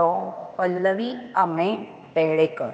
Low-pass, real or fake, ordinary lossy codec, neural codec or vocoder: none; fake; none; codec, 16 kHz, 0.8 kbps, ZipCodec